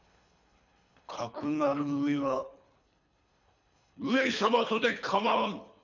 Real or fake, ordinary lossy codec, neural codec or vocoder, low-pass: fake; none; codec, 24 kHz, 3 kbps, HILCodec; 7.2 kHz